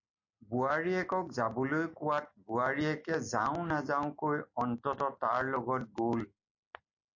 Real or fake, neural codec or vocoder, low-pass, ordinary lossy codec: real; none; 7.2 kHz; MP3, 48 kbps